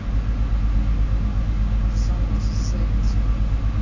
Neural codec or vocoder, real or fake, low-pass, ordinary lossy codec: none; real; 7.2 kHz; AAC, 48 kbps